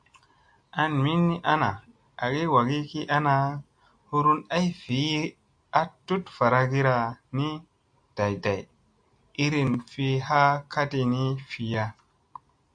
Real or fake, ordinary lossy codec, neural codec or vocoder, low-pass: real; AAC, 64 kbps; none; 9.9 kHz